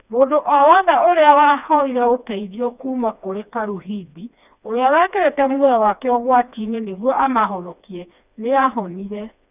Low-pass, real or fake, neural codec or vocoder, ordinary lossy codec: 3.6 kHz; fake; codec, 16 kHz, 2 kbps, FreqCodec, smaller model; none